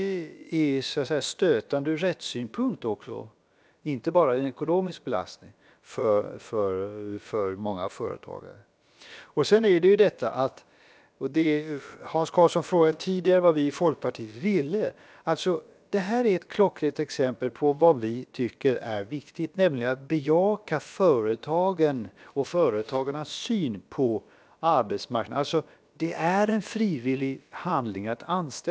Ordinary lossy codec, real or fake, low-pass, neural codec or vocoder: none; fake; none; codec, 16 kHz, about 1 kbps, DyCAST, with the encoder's durations